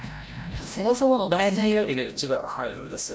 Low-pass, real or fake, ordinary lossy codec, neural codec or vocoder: none; fake; none; codec, 16 kHz, 0.5 kbps, FreqCodec, larger model